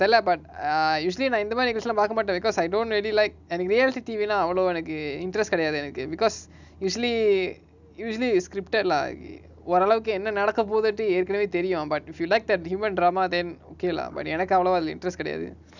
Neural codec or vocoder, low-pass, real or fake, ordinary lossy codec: none; 7.2 kHz; real; none